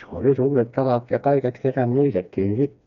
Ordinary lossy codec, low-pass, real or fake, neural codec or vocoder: none; 7.2 kHz; fake; codec, 16 kHz, 2 kbps, FreqCodec, smaller model